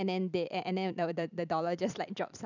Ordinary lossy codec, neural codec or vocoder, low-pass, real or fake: none; vocoder, 44.1 kHz, 128 mel bands every 256 samples, BigVGAN v2; 7.2 kHz; fake